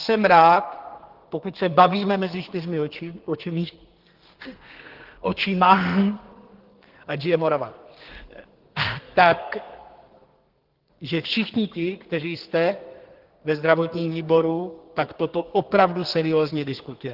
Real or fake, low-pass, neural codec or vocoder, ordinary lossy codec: fake; 5.4 kHz; codec, 32 kHz, 1.9 kbps, SNAC; Opus, 16 kbps